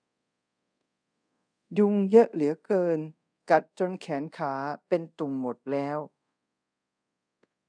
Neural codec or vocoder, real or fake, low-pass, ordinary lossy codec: codec, 24 kHz, 0.5 kbps, DualCodec; fake; 9.9 kHz; none